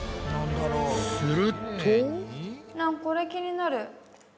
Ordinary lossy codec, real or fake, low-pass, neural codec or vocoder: none; real; none; none